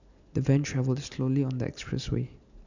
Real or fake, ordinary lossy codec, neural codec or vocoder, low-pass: real; none; none; 7.2 kHz